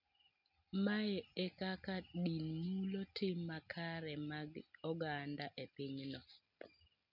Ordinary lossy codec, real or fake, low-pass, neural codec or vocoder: none; real; 5.4 kHz; none